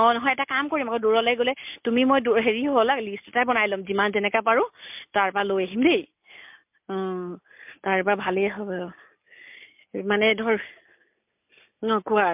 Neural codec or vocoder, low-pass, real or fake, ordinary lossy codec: none; 3.6 kHz; real; MP3, 32 kbps